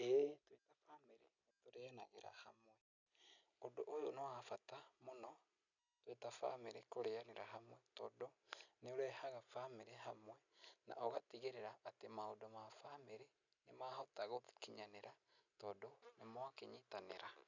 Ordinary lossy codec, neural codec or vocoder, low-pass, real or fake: none; none; 7.2 kHz; real